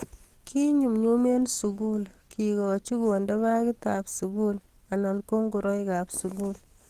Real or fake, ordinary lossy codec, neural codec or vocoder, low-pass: fake; Opus, 24 kbps; codec, 44.1 kHz, 7.8 kbps, DAC; 14.4 kHz